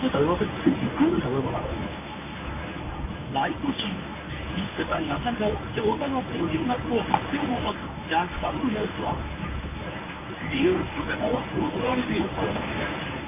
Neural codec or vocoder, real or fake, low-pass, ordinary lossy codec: codec, 24 kHz, 0.9 kbps, WavTokenizer, medium speech release version 1; fake; 3.6 kHz; AAC, 24 kbps